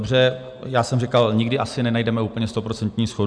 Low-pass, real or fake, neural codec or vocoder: 9.9 kHz; real; none